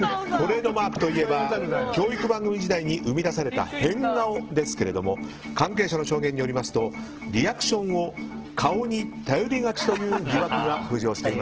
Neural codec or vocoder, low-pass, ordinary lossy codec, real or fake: none; 7.2 kHz; Opus, 16 kbps; real